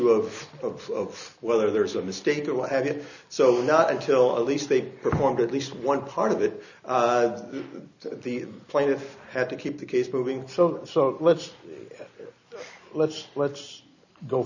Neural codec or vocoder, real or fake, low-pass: none; real; 7.2 kHz